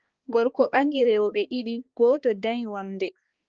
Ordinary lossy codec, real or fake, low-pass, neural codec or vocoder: Opus, 16 kbps; fake; 7.2 kHz; codec, 16 kHz, 2 kbps, X-Codec, HuBERT features, trained on balanced general audio